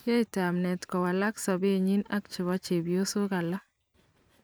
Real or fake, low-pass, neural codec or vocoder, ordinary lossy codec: fake; none; vocoder, 44.1 kHz, 128 mel bands every 512 samples, BigVGAN v2; none